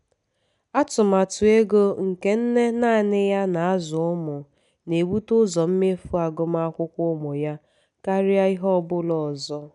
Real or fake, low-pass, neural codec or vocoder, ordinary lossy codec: real; 10.8 kHz; none; none